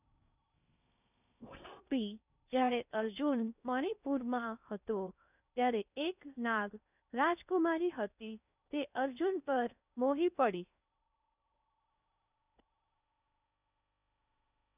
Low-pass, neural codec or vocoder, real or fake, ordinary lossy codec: 3.6 kHz; codec, 16 kHz in and 24 kHz out, 0.6 kbps, FocalCodec, streaming, 4096 codes; fake; none